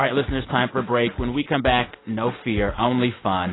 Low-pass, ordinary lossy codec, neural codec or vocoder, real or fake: 7.2 kHz; AAC, 16 kbps; none; real